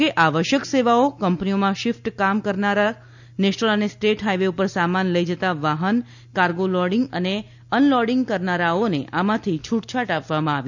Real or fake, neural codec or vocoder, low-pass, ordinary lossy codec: real; none; 7.2 kHz; none